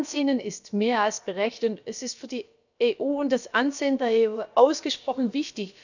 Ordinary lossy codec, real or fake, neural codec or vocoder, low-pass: none; fake; codec, 16 kHz, about 1 kbps, DyCAST, with the encoder's durations; 7.2 kHz